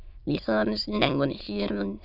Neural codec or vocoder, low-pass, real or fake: autoencoder, 22.05 kHz, a latent of 192 numbers a frame, VITS, trained on many speakers; 5.4 kHz; fake